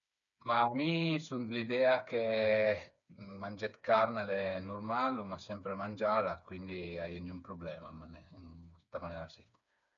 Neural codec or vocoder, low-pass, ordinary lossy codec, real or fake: codec, 16 kHz, 4 kbps, FreqCodec, smaller model; 7.2 kHz; none; fake